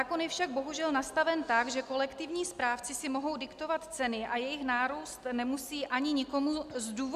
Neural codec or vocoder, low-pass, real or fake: none; 14.4 kHz; real